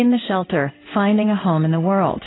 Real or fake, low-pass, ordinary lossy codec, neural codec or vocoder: fake; 7.2 kHz; AAC, 16 kbps; codec, 16 kHz in and 24 kHz out, 1 kbps, XY-Tokenizer